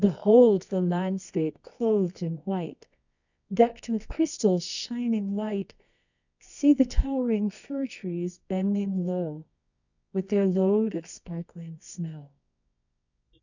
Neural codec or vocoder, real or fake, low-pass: codec, 24 kHz, 0.9 kbps, WavTokenizer, medium music audio release; fake; 7.2 kHz